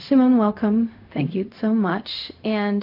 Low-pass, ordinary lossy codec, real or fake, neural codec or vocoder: 5.4 kHz; AAC, 32 kbps; fake; codec, 16 kHz, 0.4 kbps, LongCat-Audio-Codec